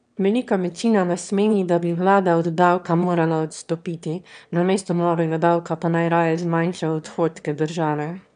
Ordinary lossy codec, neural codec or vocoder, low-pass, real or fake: none; autoencoder, 22.05 kHz, a latent of 192 numbers a frame, VITS, trained on one speaker; 9.9 kHz; fake